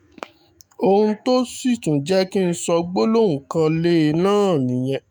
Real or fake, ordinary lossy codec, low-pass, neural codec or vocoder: fake; none; none; autoencoder, 48 kHz, 128 numbers a frame, DAC-VAE, trained on Japanese speech